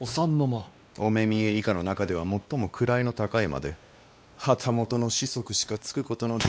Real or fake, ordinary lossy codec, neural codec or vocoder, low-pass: fake; none; codec, 16 kHz, 2 kbps, X-Codec, WavLM features, trained on Multilingual LibriSpeech; none